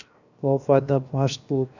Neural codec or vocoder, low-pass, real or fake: codec, 16 kHz, 0.7 kbps, FocalCodec; 7.2 kHz; fake